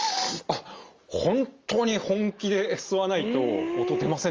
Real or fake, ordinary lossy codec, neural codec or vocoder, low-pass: real; Opus, 32 kbps; none; 7.2 kHz